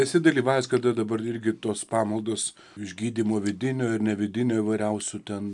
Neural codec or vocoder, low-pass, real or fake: none; 10.8 kHz; real